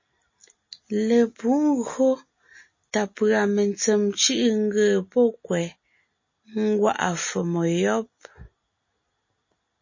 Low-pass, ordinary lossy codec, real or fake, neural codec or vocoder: 7.2 kHz; MP3, 32 kbps; real; none